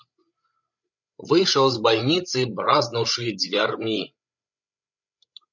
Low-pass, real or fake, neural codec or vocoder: 7.2 kHz; fake; codec, 16 kHz, 16 kbps, FreqCodec, larger model